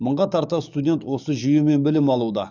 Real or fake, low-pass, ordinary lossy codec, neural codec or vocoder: fake; 7.2 kHz; none; codec, 44.1 kHz, 7.8 kbps, DAC